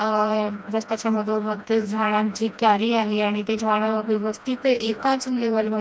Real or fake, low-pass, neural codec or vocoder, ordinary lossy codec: fake; none; codec, 16 kHz, 1 kbps, FreqCodec, smaller model; none